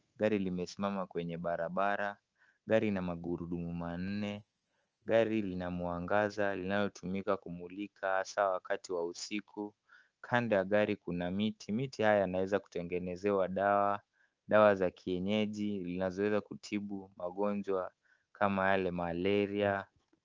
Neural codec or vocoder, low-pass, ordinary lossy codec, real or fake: codec, 24 kHz, 3.1 kbps, DualCodec; 7.2 kHz; Opus, 24 kbps; fake